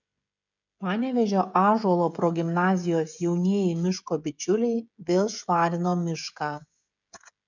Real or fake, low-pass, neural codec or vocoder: fake; 7.2 kHz; codec, 16 kHz, 16 kbps, FreqCodec, smaller model